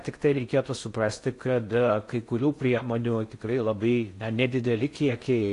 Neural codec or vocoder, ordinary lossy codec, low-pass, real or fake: codec, 16 kHz in and 24 kHz out, 0.6 kbps, FocalCodec, streaming, 4096 codes; AAC, 48 kbps; 10.8 kHz; fake